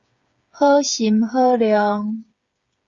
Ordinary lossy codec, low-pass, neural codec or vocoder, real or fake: Opus, 64 kbps; 7.2 kHz; codec, 16 kHz, 8 kbps, FreqCodec, smaller model; fake